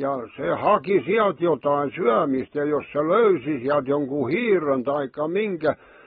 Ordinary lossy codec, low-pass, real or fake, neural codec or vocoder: AAC, 16 kbps; 7.2 kHz; real; none